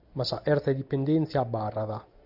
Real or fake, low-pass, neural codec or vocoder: real; 5.4 kHz; none